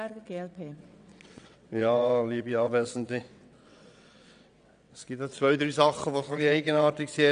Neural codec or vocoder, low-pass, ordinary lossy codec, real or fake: vocoder, 22.05 kHz, 80 mel bands, WaveNeXt; 9.9 kHz; MP3, 64 kbps; fake